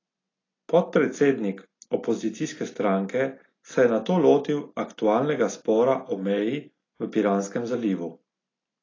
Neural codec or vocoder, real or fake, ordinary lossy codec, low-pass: none; real; AAC, 32 kbps; 7.2 kHz